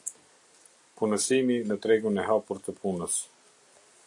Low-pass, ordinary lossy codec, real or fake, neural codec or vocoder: 10.8 kHz; AAC, 64 kbps; real; none